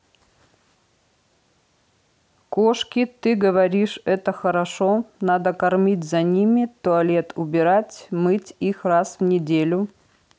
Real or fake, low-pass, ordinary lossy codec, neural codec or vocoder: real; none; none; none